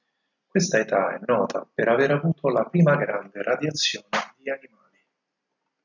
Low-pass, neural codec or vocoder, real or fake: 7.2 kHz; none; real